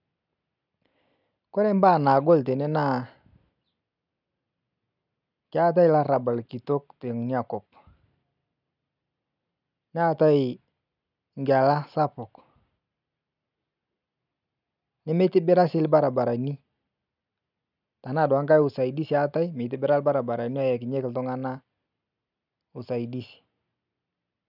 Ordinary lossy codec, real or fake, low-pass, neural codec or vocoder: none; real; 5.4 kHz; none